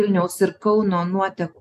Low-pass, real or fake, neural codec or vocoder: 14.4 kHz; real; none